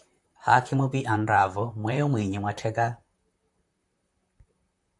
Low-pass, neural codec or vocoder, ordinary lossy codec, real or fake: 10.8 kHz; vocoder, 44.1 kHz, 128 mel bands, Pupu-Vocoder; AAC, 64 kbps; fake